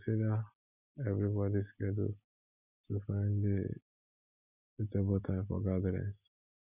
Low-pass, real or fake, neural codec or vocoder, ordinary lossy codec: 3.6 kHz; real; none; none